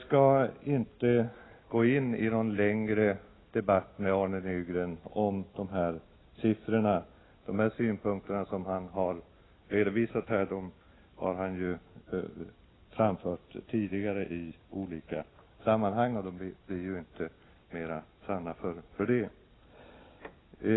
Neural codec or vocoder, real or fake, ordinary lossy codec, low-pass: none; real; AAC, 16 kbps; 7.2 kHz